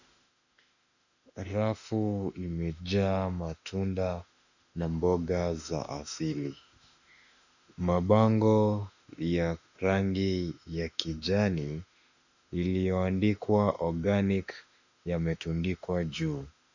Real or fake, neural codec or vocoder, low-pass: fake; autoencoder, 48 kHz, 32 numbers a frame, DAC-VAE, trained on Japanese speech; 7.2 kHz